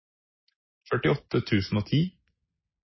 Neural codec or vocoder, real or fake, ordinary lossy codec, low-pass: none; real; MP3, 24 kbps; 7.2 kHz